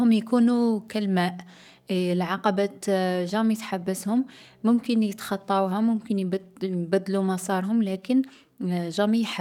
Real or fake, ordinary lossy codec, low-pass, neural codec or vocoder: fake; none; 19.8 kHz; codec, 44.1 kHz, 7.8 kbps, DAC